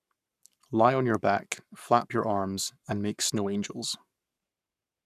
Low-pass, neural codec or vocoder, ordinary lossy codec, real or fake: 14.4 kHz; codec, 44.1 kHz, 7.8 kbps, Pupu-Codec; none; fake